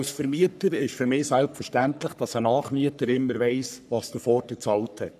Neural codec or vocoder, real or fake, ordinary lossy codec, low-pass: codec, 44.1 kHz, 3.4 kbps, Pupu-Codec; fake; none; 14.4 kHz